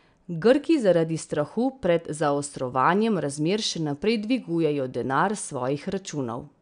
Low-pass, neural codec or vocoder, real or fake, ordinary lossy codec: 9.9 kHz; none; real; none